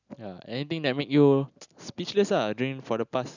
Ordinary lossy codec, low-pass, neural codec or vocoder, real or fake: none; 7.2 kHz; none; real